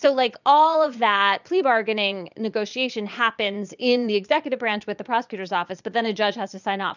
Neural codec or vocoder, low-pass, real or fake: vocoder, 22.05 kHz, 80 mel bands, Vocos; 7.2 kHz; fake